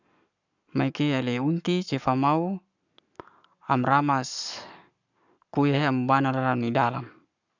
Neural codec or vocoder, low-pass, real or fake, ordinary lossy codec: codec, 44.1 kHz, 7.8 kbps, Pupu-Codec; 7.2 kHz; fake; none